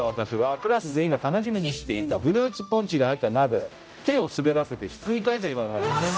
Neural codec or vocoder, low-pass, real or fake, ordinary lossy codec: codec, 16 kHz, 0.5 kbps, X-Codec, HuBERT features, trained on general audio; none; fake; none